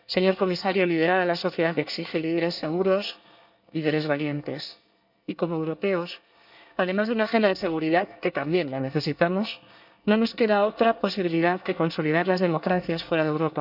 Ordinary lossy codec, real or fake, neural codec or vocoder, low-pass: none; fake; codec, 24 kHz, 1 kbps, SNAC; 5.4 kHz